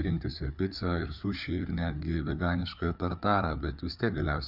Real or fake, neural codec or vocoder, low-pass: fake; codec, 16 kHz, 4 kbps, FreqCodec, larger model; 5.4 kHz